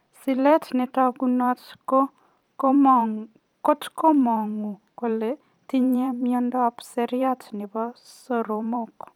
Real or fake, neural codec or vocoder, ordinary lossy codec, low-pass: fake; vocoder, 44.1 kHz, 128 mel bands every 512 samples, BigVGAN v2; none; 19.8 kHz